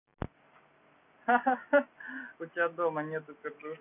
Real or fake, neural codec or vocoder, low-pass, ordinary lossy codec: real; none; 3.6 kHz; none